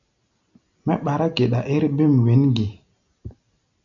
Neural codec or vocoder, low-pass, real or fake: none; 7.2 kHz; real